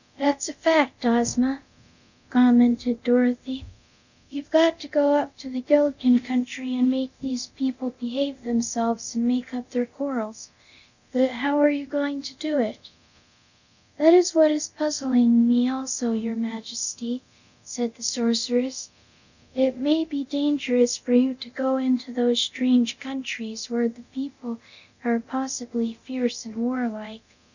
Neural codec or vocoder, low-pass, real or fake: codec, 24 kHz, 0.9 kbps, DualCodec; 7.2 kHz; fake